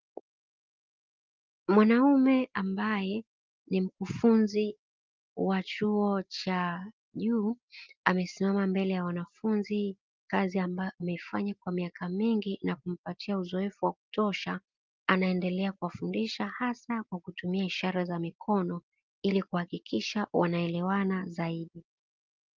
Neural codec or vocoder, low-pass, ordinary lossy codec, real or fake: none; 7.2 kHz; Opus, 32 kbps; real